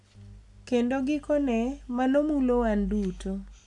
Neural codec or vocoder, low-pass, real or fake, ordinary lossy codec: none; 10.8 kHz; real; AAC, 64 kbps